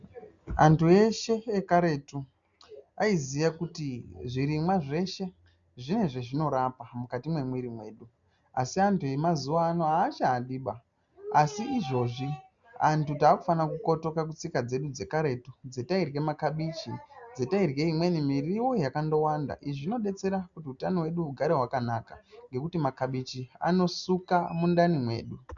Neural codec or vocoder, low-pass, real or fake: none; 7.2 kHz; real